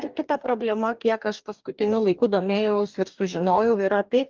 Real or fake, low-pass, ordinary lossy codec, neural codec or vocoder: fake; 7.2 kHz; Opus, 32 kbps; codec, 44.1 kHz, 2.6 kbps, DAC